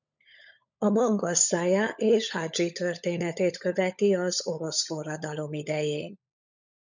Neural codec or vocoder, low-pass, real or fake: codec, 16 kHz, 16 kbps, FunCodec, trained on LibriTTS, 50 frames a second; 7.2 kHz; fake